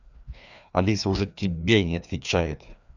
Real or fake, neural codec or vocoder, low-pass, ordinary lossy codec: fake; codec, 16 kHz, 2 kbps, FreqCodec, larger model; 7.2 kHz; none